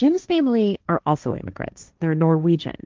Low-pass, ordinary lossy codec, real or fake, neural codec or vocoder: 7.2 kHz; Opus, 24 kbps; fake; codec, 16 kHz, 1.1 kbps, Voila-Tokenizer